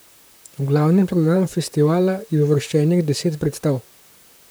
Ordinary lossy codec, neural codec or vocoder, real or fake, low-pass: none; vocoder, 44.1 kHz, 128 mel bands, Pupu-Vocoder; fake; none